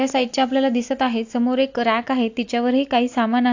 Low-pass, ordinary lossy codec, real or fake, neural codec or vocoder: 7.2 kHz; AAC, 48 kbps; real; none